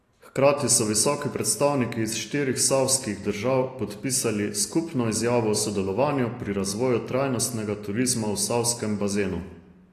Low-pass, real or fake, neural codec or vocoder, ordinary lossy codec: 14.4 kHz; real; none; AAC, 48 kbps